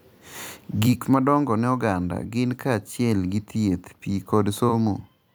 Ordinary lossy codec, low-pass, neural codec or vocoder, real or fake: none; none; vocoder, 44.1 kHz, 128 mel bands every 256 samples, BigVGAN v2; fake